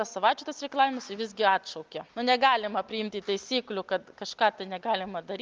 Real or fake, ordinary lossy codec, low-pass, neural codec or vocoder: real; Opus, 32 kbps; 7.2 kHz; none